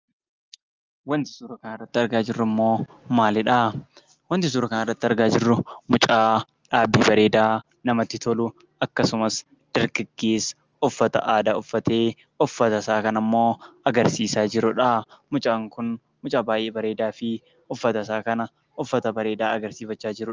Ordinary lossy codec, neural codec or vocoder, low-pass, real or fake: Opus, 32 kbps; none; 7.2 kHz; real